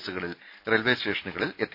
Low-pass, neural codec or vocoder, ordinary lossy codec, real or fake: 5.4 kHz; none; none; real